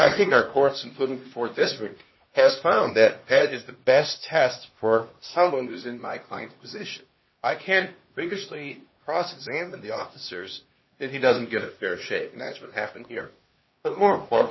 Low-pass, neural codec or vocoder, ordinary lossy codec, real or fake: 7.2 kHz; codec, 16 kHz, 2 kbps, X-Codec, HuBERT features, trained on LibriSpeech; MP3, 24 kbps; fake